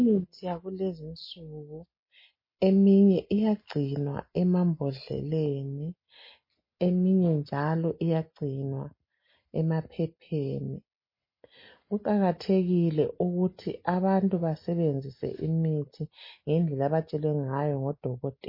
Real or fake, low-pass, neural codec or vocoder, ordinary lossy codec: real; 5.4 kHz; none; MP3, 24 kbps